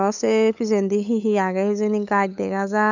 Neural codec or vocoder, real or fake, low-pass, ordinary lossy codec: codec, 16 kHz, 8 kbps, FunCodec, trained on LibriTTS, 25 frames a second; fake; 7.2 kHz; none